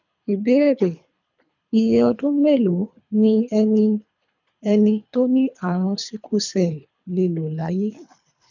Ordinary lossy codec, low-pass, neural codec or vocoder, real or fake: none; 7.2 kHz; codec, 24 kHz, 3 kbps, HILCodec; fake